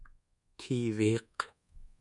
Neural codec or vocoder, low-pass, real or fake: codec, 24 kHz, 1.2 kbps, DualCodec; 10.8 kHz; fake